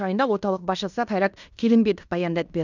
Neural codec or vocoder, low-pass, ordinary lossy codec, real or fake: codec, 16 kHz in and 24 kHz out, 0.9 kbps, LongCat-Audio-Codec, fine tuned four codebook decoder; 7.2 kHz; none; fake